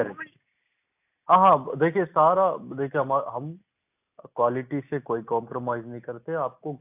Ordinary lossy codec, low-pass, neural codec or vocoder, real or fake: AAC, 32 kbps; 3.6 kHz; none; real